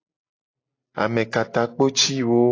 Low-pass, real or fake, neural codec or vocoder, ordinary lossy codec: 7.2 kHz; real; none; AAC, 32 kbps